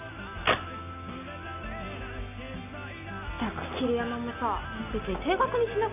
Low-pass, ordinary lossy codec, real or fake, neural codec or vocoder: 3.6 kHz; none; real; none